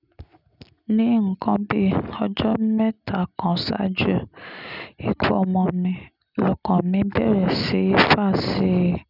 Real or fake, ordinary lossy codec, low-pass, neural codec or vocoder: real; none; 5.4 kHz; none